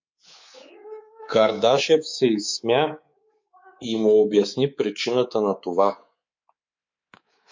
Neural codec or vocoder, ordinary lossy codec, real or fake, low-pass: codec, 24 kHz, 3.1 kbps, DualCodec; MP3, 48 kbps; fake; 7.2 kHz